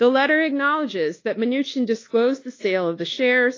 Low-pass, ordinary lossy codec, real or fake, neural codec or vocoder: 7.2 kHz; AAC, 32 kbps; fake; codec, 24 kHz, 1.2 kbps, DualCodec